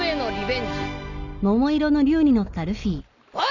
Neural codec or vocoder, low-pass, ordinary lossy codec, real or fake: none; 7.2 kHz; none; real